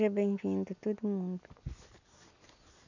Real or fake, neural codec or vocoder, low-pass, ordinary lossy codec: real; none; 7.2 kHz; none